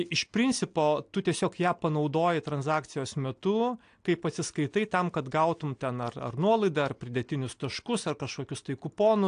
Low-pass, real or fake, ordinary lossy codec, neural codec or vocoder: 9.9 kHz; real; AAC, 64 kbps; none